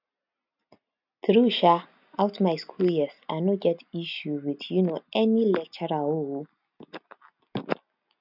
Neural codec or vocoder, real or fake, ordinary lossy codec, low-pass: none; real; none; 5.4 kHz